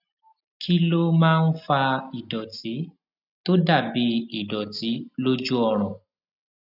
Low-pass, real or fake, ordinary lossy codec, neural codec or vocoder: 5.4 kHz; real; none; none